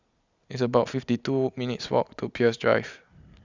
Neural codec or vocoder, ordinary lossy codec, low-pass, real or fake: none; Opus, 64 kbps; 7.2 kHz; real